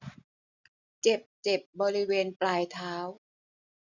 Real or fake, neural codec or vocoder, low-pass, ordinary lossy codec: real; none; 7.2 kHz; none